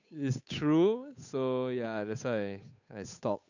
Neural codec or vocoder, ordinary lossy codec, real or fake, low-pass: codec, 16 kHz, 8 kbps, FunCodec, trained on Chinese and English, 25 frames a second; none; fake; 7.2 kHz